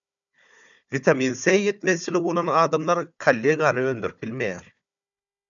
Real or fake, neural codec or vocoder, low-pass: fake; codec, 16 kHz, 4 kbps, FunCodec, trained on Chinese and English, 50 frames a second; 7.2 kHz